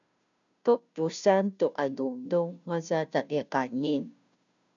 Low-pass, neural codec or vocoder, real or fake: 7.2 kHz; codec, 16 kHz, 0.5 kbps, FunCodec, trained on Chinese and English, 25 frames a second; fake